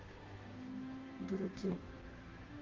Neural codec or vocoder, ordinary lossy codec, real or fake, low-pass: codec, 16 kHz, 2 kbps, FunCodec, trained on Chinese and English, 25 frames a second; Opus, 16 kbps; fake; 7.2 kHz